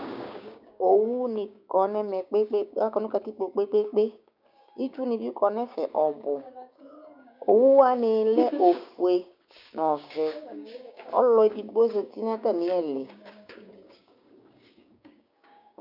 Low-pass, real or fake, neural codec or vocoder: 5.4 kHz; fake; codec, 16 kHz, 6 kbps, DAC